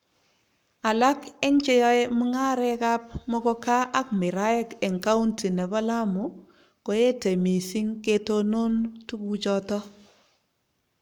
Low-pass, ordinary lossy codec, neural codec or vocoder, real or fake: 19.8 kHz; none; codec, 44.1 kHz, 7.8 kbps, Pupu-Codec; fake